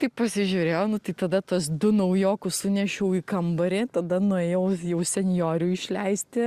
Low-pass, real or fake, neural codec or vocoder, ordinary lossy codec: 14.4 kHz; real; none; Opus, 64 kbps